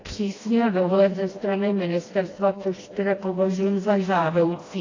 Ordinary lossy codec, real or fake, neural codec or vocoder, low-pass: AAC, 32 kbps; fake; codec, 16 kHz, 1 kbps, FreqCodec, smaller model; 7.2 kHz